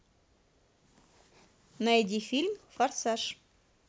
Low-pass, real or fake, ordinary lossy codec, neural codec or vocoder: none; real; none; none